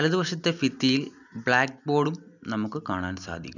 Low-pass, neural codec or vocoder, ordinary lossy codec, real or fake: 7.2 kHz; none; none; real